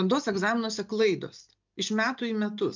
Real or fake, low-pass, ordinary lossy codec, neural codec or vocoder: real; 7.2 kHz; MP3, 64 kbps; none